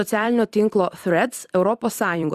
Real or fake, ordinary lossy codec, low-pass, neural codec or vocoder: fake; Opus, 64 kbps; 14.4 kHz; vocoder, 44.1 kHz, 128 mel bands every 256 samples, BigVGAN v2